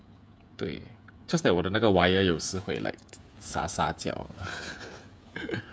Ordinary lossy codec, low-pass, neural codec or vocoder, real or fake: none; none; codec, 16 kHz, 16 kbps, FreqCodec, smaller model; fake